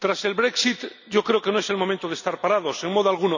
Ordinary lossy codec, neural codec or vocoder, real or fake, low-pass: none; none; real; 7.2 kHz